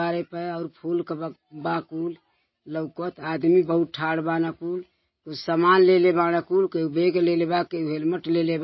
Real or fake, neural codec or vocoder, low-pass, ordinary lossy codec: real; none; 7.2 kHz; MP3, 24 kbps